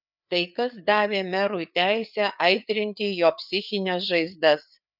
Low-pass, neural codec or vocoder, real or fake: 5.4 kHz; codec, 16 kHz, 4 kbps, FreqCodec, larger model; fake